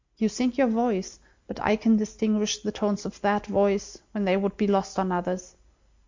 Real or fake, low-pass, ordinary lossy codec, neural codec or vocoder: real; 7.2 kHz; MP3, 64 kbps; none